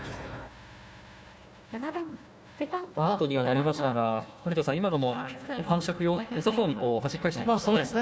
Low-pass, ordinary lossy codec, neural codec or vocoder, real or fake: none; none; codec, 16 kHz, 1 kbps, FunCodec, trained on Chinese and English, 50 frames a second; fake